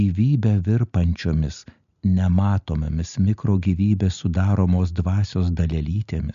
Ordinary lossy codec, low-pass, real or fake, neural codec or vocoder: AAC, 96 kbps; 7.2 kHz; real; none